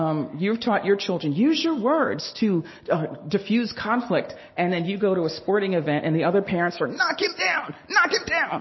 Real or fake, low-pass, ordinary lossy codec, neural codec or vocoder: real; 7.2 kHz; MP3, 24 kbps; none